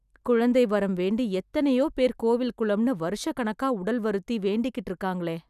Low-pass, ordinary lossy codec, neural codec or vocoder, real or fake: 14.4 kHz; none; none; real